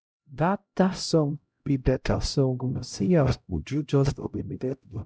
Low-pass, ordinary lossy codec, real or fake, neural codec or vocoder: none; none; fake; codec, 16 kHz, 0.5 kbps, X-Codec, HuBERT features, trained on LibriSpeech